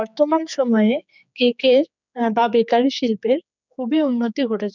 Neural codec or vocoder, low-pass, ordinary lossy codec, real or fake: codec, 16 kHz, 4 kbps, X-Codec, HuBERT features, trained on general audio; 7.2 kHz; none; fake